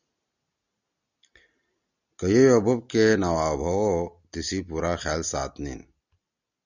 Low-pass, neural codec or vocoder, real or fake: 7.2 kHz; none; real